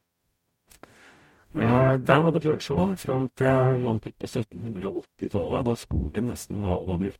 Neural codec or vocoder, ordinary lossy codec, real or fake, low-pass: codec, 44.1 kHz, 0.9 kbps, DAC; MP3, 64 kbps; fake; 19.8 kHz